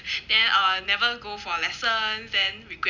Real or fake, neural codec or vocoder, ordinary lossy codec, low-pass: real; none; none; 7.2 kHz